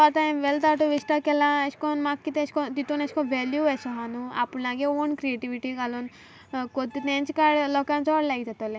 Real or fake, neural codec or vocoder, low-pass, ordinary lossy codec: real; none; none; none